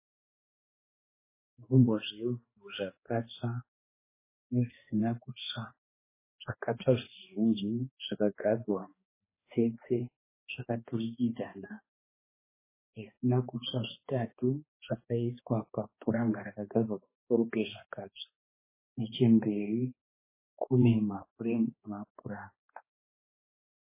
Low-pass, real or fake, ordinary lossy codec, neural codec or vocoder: 3.6 kHz; fake; MP3, 16 kbps; codec, 16 kHz, 2 kbps, X-Codec, HuBERT features, trained on balanced general audio